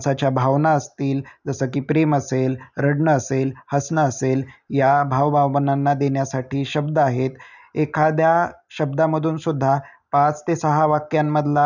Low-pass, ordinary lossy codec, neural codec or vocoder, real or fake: 7.2 kHz; none; none; real